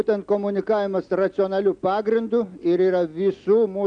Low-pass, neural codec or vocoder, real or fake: 9.9 kHz; none; real